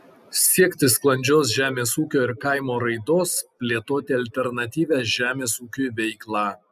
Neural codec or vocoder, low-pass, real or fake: none; 14.4 kHz; real